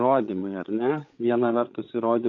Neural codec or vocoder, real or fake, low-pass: codec, 16 kHz, 8 kbps, FreqCodec, larger model; fake; 7.2 kHz